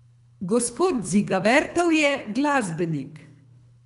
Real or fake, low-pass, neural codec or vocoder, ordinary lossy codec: fake; 10.8 kHz; codec, 24 kHz, 3 kbps, HILCodec; none